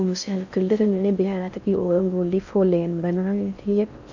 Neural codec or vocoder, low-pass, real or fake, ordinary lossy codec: codec, 16 kHz in and 24 kHz out, 0.6 kbps, FocalCodec, streaming, 4096 codes; 7.2 kHz; fake; none